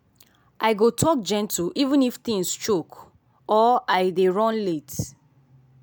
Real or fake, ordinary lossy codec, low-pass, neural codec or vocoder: real; none; none; none